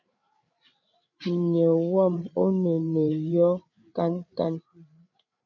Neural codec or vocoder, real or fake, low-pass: codec, 16 kHz, 8 kbps, FreqCodec, larger model; fake; 7.2 kHz